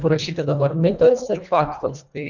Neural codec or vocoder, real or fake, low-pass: codec, 24 kHz, 1.5 kbps, HILCodec; fake; 7.2 kHz